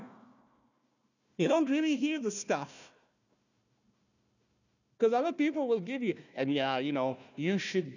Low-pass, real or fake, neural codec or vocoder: 7.2 kHz; fake; codec, 16 kHz, 1 kbps, FunCodec, trained on Chinese and English, 50 frames a second